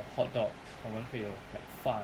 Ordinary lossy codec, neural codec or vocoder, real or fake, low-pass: Opus, 16 kbps; none; real; 19.8 kHz